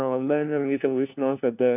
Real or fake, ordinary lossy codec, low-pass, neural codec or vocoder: fake; none; 3.6 kHz; codec, 16 kHz, 1 kbps, FunCodec, trained on LibriTTS, 50 frames a second